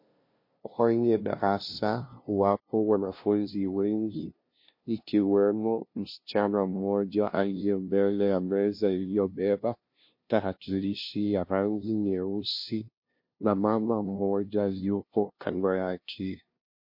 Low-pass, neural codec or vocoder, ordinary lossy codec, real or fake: 5.4 kHz; codec, 16 kHz, 0.5 kbps, FunCodec, trained on LibriTTS, 25 frames a second; MP3, 32 kbps; fake